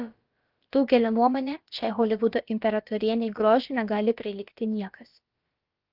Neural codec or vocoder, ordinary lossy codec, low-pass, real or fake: codec, 16 kHz, about 1 kbps, DyCAST, with the encoder's durations; Opus, 32 kbps; 5.4 kHz; fake